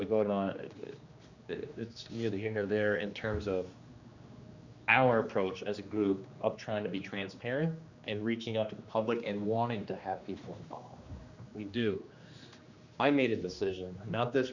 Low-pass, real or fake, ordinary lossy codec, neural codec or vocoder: 7.2 kHz; fake; Opus, 64 kbps; codec, 16 kHz, 2 kbps, X-Codec, HuBERT features, trained on general audio